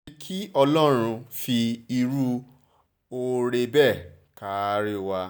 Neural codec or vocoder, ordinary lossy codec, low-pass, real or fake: none; none; none; real